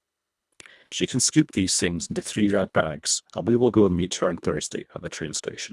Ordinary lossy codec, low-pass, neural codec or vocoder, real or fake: none; none; codec, 24 kHz, 1.5 kbps, HILCodec; fake